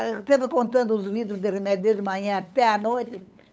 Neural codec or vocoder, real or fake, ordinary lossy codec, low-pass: codec, 16 kHz, 8 kbps, FunCodec, trained on LibriTTS, 25 frames a second; fake; none; none